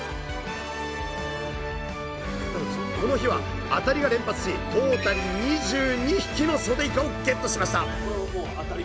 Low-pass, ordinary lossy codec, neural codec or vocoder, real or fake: none; none; none; real